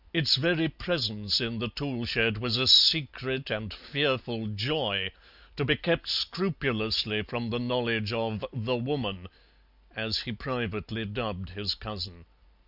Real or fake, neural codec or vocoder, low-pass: real; none; 5.4 kHz